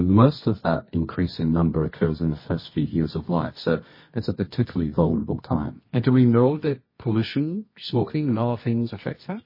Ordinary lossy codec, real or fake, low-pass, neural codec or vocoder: MP3, 24 kbps; fake; 5.4 kHz; codec, 24 kHz, 0.9 kbps, WavTokenizer, medium music audio release